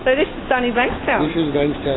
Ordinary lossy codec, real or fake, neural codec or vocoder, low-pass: AAC, 16 kbps; real; none; 7.2 kHz